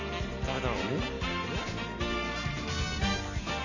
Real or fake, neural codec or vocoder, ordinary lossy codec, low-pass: real; none; none; 7.2 kHz